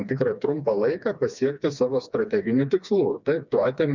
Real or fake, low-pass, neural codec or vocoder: fake; 7.2 kHz; codec, 16 kHz, 2 kbps, FreqCodec, smaller model